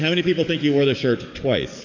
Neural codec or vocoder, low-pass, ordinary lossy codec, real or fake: codec, 44.1 kHz, 7.8 kbps, DAC; 7.2 kHz; MP3, 64 kbps; fake